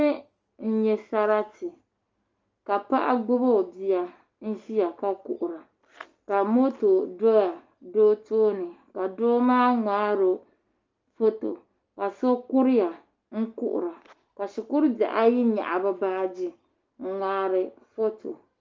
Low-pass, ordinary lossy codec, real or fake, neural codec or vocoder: 7.2 kHz; Opus, 24 kbps; real; none